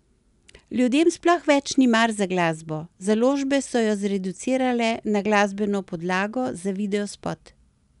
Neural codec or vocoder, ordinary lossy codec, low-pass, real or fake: none; none; 10.8 kHz; real